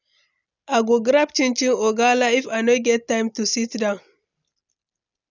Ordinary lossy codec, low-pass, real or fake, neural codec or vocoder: none; 7.2 kHz; real; none